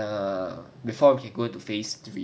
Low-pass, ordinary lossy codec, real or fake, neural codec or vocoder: none; none; real; none